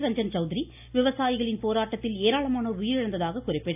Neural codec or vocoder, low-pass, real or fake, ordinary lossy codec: none; 3.6 kHz; real; AAC, 32 kbps